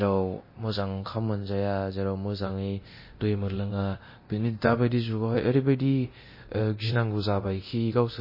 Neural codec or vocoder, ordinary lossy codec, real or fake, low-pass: codec, 24 kHz, 0.9 kbps, DualCodec; MP3, 24 kbps; fake; 5.4 kHz